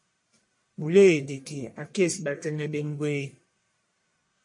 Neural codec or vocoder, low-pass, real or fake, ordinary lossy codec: codec, 44.1 kHz, 1.7 kbps, Pupu-Codec; 10.8 kHz; fake; MP3, 48 kbps